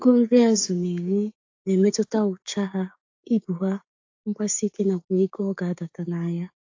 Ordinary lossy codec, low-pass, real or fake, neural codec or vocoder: AAC, 48 kbps; 7.2 kHz; fake; codec, 16 kHz, 6 kbps, DAC